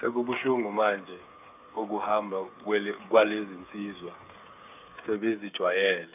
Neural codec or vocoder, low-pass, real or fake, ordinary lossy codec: codec, 16 kHz, 8 kbps, FreqCodec, smaller model; 3.6 kHz; fake; none